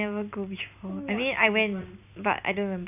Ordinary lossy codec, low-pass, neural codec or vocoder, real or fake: none; 3.6 kHz; none; real